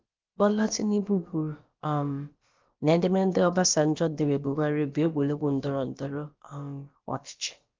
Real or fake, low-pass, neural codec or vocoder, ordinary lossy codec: fake; 7.2 kHz; codec, 16 kHz, about 1 kbps, DyCAST, with the encoder's durations; Opus, 32 kbps